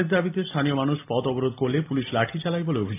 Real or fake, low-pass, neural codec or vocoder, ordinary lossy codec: real; 3.6 kHz; none; AAC, 24 kbps